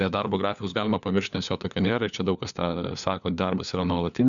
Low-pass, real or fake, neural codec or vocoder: 7.2 kHz; fake; codec, 16 kHz, 4 kbps, FunCodec, trained on LibriTTS, 50 frames a second